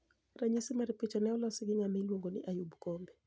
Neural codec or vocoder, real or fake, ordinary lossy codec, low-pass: none; real; none; none